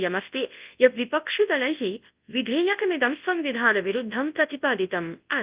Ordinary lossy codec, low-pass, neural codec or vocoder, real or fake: Opus, 24 kbps; 3.6 kHz; codec, 24 kHz, 0.9 kbps, WavTokenizer, large speech release; fake